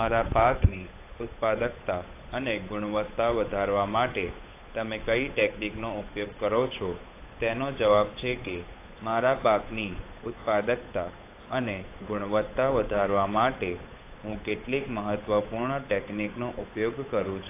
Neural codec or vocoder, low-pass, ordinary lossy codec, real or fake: codec, 16 kHz, 8 kbps, FunCodec, trained on Chinese and English, 25 frames a second; 3.6 kHz; AAC, 24 kbps; fake